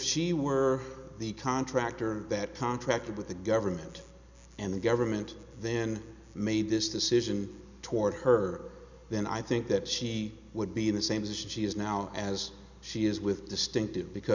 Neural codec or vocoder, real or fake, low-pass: none; real; 7.2 kHz